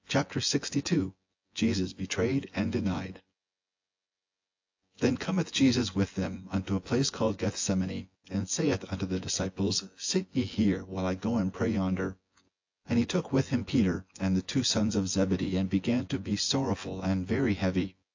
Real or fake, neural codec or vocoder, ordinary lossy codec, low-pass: fake; vocoder, 24 kHz, 100 mel bands, Vocos; AAC, 48 kbps; 7.2 kHz